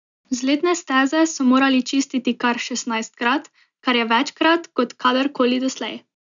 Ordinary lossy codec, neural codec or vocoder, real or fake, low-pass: none; none; real; 7.2 kHz